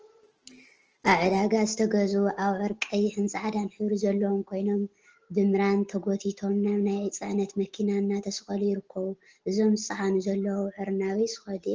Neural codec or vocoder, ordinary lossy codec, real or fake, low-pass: none; Opus, 16 kbps; real; 7.2 kHz